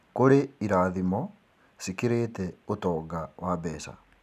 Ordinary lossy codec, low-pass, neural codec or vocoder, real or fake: none; 14.4 kHz; none; real